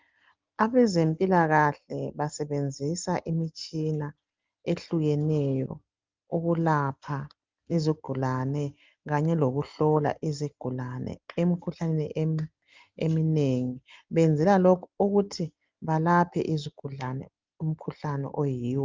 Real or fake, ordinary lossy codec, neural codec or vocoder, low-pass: real; Opus, 24 kbps; none; 7.2 kHz